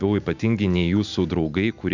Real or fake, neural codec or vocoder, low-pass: real; none; 7.2 kHz